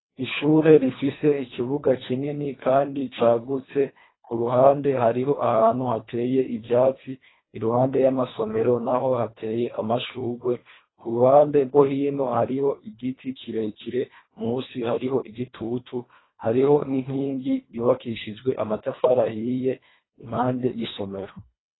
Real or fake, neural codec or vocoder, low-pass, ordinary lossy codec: fake; codec, 24 kHz, 1.5 kbps, HILCodec; 7.2 kHz; AAC, 16 kbps